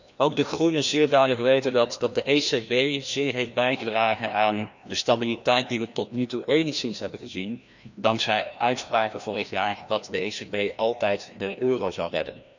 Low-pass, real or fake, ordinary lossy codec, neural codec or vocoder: 7.2 kHz; fake; none; codec, 16 kHz, 1 kbps, FreqCodec, larger model